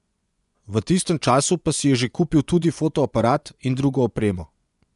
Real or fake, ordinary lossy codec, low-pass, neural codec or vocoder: fake; none; 10.8 kHz; vocoder, 24 kHz, 100 mel bands, Vocos